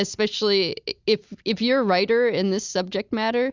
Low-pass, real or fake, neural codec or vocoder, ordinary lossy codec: 7.2 kHz; real; none; Opus, 64 kbps